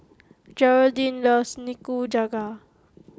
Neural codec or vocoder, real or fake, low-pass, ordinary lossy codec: none; real; none; none